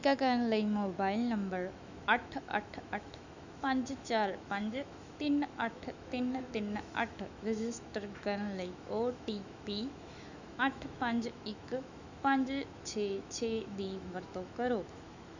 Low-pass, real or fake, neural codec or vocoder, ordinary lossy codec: 7.2 kHz; fake; autoencoder, 48 kHz, 128 numbers a frame, DAC-VAE, trained on Japanese speech; none